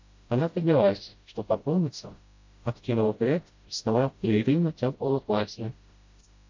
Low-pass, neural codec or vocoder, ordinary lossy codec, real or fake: 7.2 kHz; codec, 16 kHz, 0.5 kbps, FreqCodec, smaller model; MP3, 48 kbps; fake